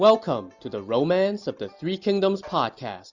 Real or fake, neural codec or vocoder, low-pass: real; none; 7.2 kHz